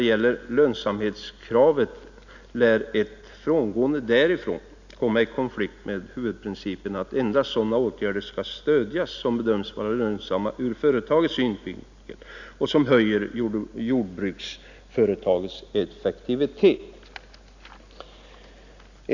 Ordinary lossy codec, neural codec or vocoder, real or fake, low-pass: none; none; real; 7.2 kHz